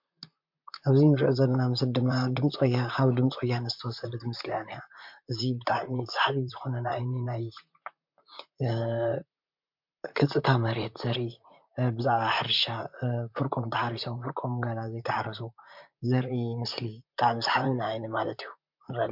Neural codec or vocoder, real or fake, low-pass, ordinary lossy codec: vocoder, 44.1 kHz, 128 mel bands, Pupu-Vocoder; fake; 5.4 kHz; MP3, 48 kbps